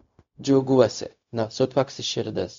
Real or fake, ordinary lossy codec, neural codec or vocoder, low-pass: fake; MP3, 48 kbps; codec, 16 kHz, 0.4 kbps, LongCat-Audio-Codec; 7.2 kHz